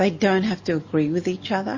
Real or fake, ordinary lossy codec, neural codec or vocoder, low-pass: real; MP3, 32 kbps; none; 7.2 kHz